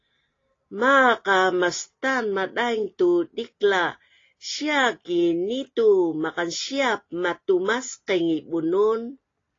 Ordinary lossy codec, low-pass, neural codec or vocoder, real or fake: AAC, 32 kbps; 7.2 kHz; none; real